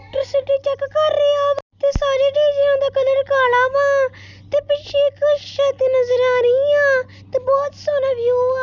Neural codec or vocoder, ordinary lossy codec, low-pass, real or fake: none; none; 7.2 kHz; real